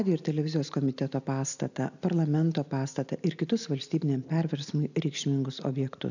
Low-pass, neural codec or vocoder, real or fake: 7.2 kHz; none; real